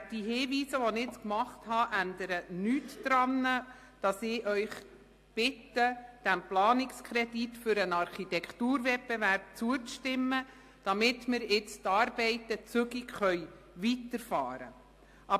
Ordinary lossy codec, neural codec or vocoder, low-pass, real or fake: AAC, 64 kbps; none; 14.4 kHz; real